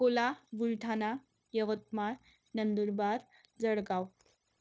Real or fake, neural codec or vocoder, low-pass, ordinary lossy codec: fake; codec, 16 kHz, 0.9 kbps, LongCat-Audio-Codec; none; none